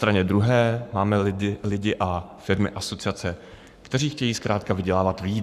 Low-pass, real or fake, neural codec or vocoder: 14.4 kHz; fake; codec, 44.1 kHz, 7.8 kbps, Pupu-Codec